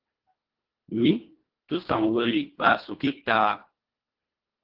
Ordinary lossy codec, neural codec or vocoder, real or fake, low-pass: Opus, 16 kbps; codec, 24 kHz, 1.5 kbps, HILCodec; fake; 5.4 kHz